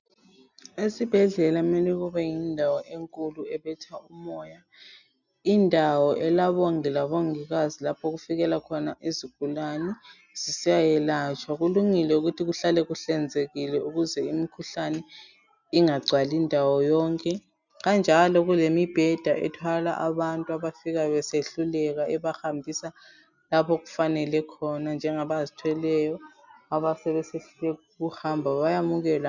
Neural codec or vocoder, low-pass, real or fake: none; 7.2 kHz; real